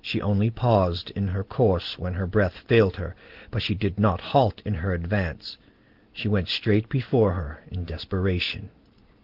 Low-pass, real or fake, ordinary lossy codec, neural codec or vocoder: 5.4 kHz; real; Opus, 16 kbps; none